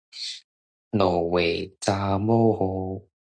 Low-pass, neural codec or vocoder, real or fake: 9.9 kHz; none; real